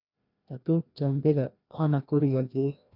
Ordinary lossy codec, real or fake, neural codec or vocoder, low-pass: none; fake; codec, 16 kHz, 1 kbps, FreqCodec, larger model; 5.4 kHz